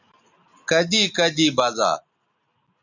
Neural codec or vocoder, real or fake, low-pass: none; real; 7.2 kHz